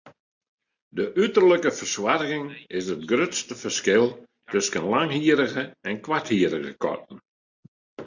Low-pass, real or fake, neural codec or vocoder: 7.2 kHz; real; none